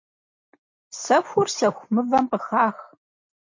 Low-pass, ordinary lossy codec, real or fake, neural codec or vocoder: 7.2 kHz; MP3, 48 kbps; real; none